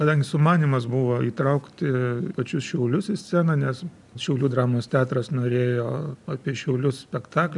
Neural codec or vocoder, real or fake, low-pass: none; real; 10.8 kHz